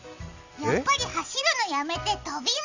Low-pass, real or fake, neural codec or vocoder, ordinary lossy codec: 7.2 kHz; real; none; none